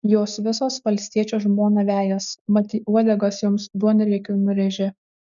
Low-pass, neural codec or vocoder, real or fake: 7.2 kHz; none; real